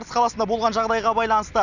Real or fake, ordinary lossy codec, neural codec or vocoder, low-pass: real; none; none; 7.2 kHz